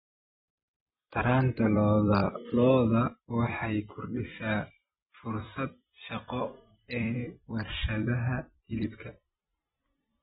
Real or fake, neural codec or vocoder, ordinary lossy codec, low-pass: fake; vocoder, 44.1 kHz, 128 mel bands every 256 samples, BigVGAN v2; AAC, 16 kbps; 19.8 kHz